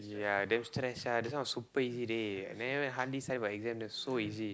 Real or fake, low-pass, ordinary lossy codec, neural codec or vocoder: real; none; none; none